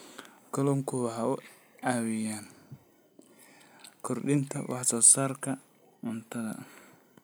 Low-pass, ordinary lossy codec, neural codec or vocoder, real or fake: none; none; none; real